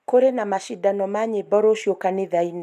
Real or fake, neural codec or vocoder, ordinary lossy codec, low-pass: real; none; none; 14.4 kHz